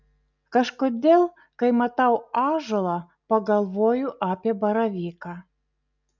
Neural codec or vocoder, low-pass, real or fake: none; 7.2 kHz; real